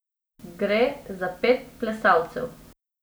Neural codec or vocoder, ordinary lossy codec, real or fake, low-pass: none; none; real; none